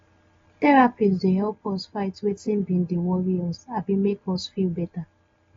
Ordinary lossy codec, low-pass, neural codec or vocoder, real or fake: AAC, 24 kbps; 7.2 kHz; none; real